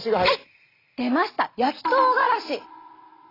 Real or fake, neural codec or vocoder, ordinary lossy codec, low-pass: real; none; AAC, 24 kbps; 5.4 kHz